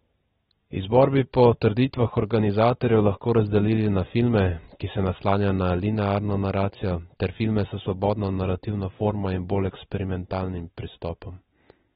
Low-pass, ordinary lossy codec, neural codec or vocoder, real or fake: 19.8 kHz; AAC, 16 kbps; none; real